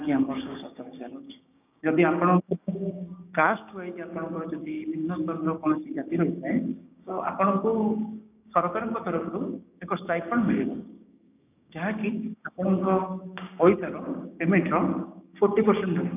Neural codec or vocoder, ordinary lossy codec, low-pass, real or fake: codec, 16 kHz, 6 kbps, DAC; none; 3.6 kHz; fake